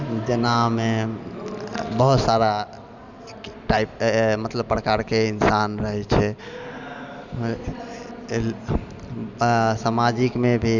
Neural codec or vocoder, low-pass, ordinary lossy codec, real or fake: none; 7.2 kHz; none; real